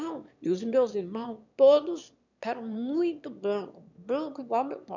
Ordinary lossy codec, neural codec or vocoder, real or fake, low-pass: none; autoencoder, 22.05 kHz, a latent of 192 numbers a frame, VITS, trained on one speaker; fake; 7.2 kHz